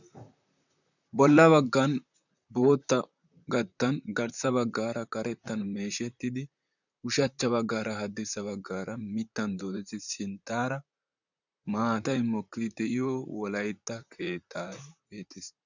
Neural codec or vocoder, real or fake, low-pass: vocoder, 44.1 kHz, 128 mel bands, Pupu-Vocoder; fake; 7.2 kHz